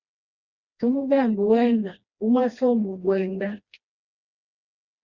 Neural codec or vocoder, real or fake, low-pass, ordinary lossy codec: codec, 16 kHz, 1 kbps, FreqCodec, smaller model; fake; 7.2 kHz; Opus, 64 kbps